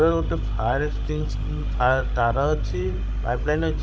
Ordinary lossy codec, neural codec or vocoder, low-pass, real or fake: none; codec, 16 kHz, 16 kbps, FreqCodec, larger model; none; fake